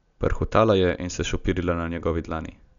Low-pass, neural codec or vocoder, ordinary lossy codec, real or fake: 7.2 kHz; none; none; real